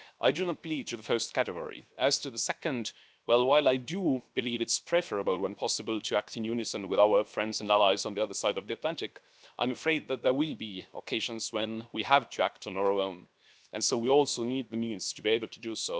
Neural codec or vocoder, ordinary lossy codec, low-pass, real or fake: codec, 16 kHz, 0.7 kbps, FocalCodec; none; none; fake